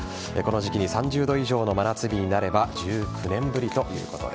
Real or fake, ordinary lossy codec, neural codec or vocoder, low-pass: real; none; none; none